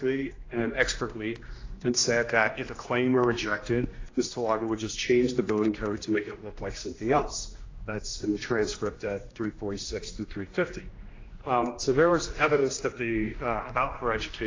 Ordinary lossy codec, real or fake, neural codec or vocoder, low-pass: AAC, 32 kbps; fake; codec, 16 kHz, 1 kbps, X-Codec, HuBERT features, trained on general audio; 7.2 kHz